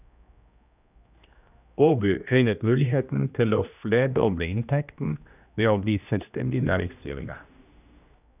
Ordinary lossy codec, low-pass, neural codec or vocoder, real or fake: none; 3.6 kHz; codec, 16 kHz, 1 kbps, X-Codec, HuBERT features, trained on general audio; fake